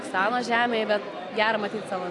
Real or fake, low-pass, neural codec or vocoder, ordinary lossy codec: real; 10.8 kHz; none; AAC, 48 kbps